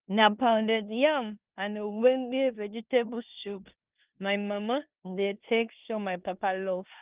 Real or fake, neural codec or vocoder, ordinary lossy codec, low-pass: fake; codec, 16 kHz in and 24 kHz out, 0.9 kbps, LongCat-Audio-Codec, four codebook decoder; Opus, 32 kbps; 3.6 kHz